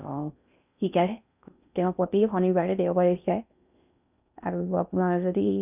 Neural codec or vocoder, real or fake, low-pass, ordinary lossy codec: codec, 16 kHz in and 24 kHz out, 0.6 kbps, FocalCodec, streaming, 4096 codes; fake; 3.6 kHz; none